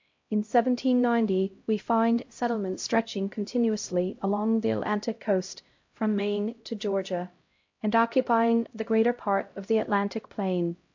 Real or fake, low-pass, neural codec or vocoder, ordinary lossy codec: fake; 7.2 kHz; codec, 16 kHz, 0.5 kbps, X-Codec, HuBERT features, trained on LibriSpeech; MP3, 48 kbps